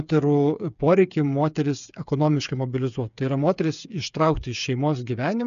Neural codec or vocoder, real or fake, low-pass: codec, 16 kHz, 8 kbps, FreqCodec, smaller model; fake; 7.2 kHz